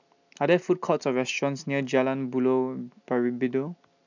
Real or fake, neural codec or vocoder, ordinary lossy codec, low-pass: real; none; none; 7.2 kHz